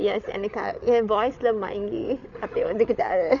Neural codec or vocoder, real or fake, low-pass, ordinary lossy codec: codec, 16 kHz, 8 kbps, FreqCodec, larger model; fake; 7.2 kHz; none